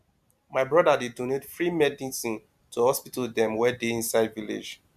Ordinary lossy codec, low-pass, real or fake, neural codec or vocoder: MP3, 96 kbps; 14.4 kHz; real; none